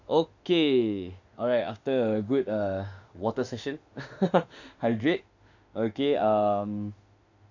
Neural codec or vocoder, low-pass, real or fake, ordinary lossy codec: autoencoder, 48 kHz, 32 numbers a frame, DAC-VAE, trained on Japanese speech; 7.2 kHz; fake; none